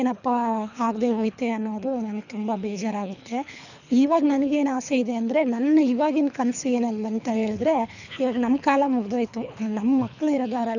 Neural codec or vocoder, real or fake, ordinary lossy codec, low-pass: codec, 24 kHz, 3 kbps, HILCodec; fake; none; 7.2 kHz